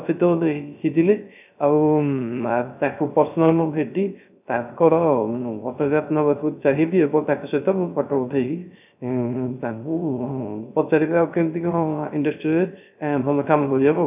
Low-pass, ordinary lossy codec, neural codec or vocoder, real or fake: 3.6 kHz; none; codec, 16 kHz, 0.3 kbps, FocalCodec; fake